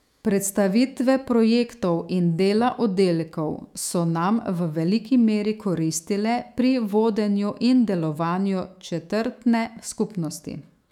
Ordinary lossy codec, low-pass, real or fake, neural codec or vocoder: none; 19.8 kHz; fake; autoencoder, 48 kHz, 128 numbers a frame, DAC-VAE, trained on Japanese speech